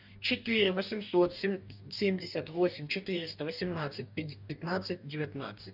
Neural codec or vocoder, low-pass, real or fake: codec, 44.1 kHz, 2.6 kbps, DAC; 5.4 kHz; fake